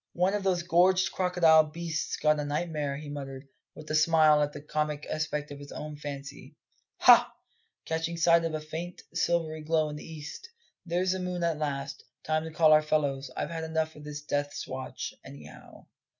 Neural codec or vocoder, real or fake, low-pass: none; real; 7.2 kHz